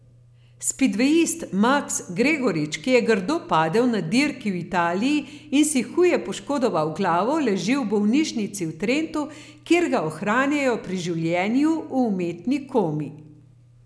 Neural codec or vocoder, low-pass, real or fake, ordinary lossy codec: none; none; real; none